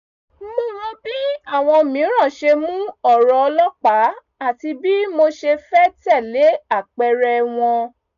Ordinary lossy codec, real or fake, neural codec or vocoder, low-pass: none; real; none; 7.2 kHz